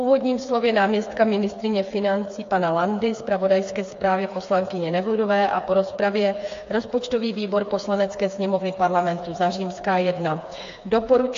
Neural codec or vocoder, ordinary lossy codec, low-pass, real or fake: codec, 16 kHz, 4 kbps, FreqCodec, smaller model; AAC, 48 kbps; 7.2 kHz; fake